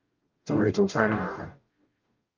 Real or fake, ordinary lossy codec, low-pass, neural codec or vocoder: fake; Opus, 24 kbps; 7.2 kHz; codec, 44.1 kHz, 0.9 kbps, DAC